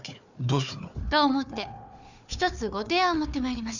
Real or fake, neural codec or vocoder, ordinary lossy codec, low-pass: fake; codec, 16 kHz, 4 kbps, FunCodec, trained on Chinese and English, 50 frames a second; none; 7.2 kHz